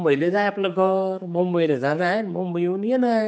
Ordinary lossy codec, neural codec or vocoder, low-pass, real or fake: none; codec, 16 kHz, 4 kbps, X-Codec, HuBERT features, trained on general audio; none; fake